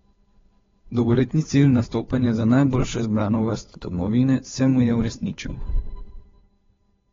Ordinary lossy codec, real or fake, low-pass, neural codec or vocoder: AAC, 24 kbps; fake; 7.2 kHz; codec, 16 kHz, 2 kbps, FunCodec, trained on Chinese and English, 25 frames a second